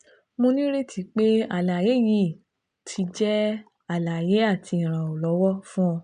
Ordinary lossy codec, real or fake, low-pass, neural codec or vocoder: MP3, 96 kbps; real; 9.9 kHz; none